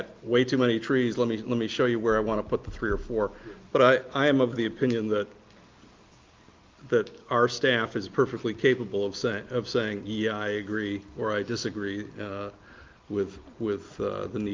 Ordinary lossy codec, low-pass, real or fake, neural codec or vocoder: Opus, 32 kbps; 7.2 kHz; real; none